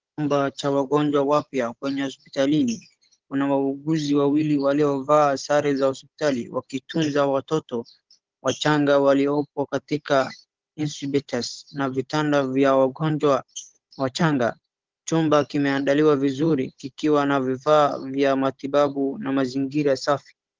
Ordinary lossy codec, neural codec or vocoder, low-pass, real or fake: Opus, 16 kbps; codec, 16 kHz, 16 kbps, FunCodec, trained on Chinese and English, 50 frames a second; 7.2 kHz; fake